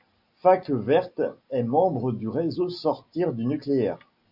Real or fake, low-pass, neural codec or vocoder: real; 5.4 kHz; none